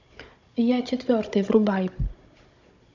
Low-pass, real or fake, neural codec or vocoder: 7.2 kHz; fake; codec, 16 kHz, 16 kbps, FunCodec, trained on Chinese and English, 50 frames a second